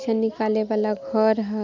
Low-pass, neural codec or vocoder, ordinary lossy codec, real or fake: 7.2 kHz; none; none; real